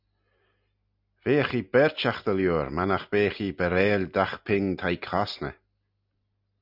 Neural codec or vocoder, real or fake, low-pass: none; real; 5.4 kHz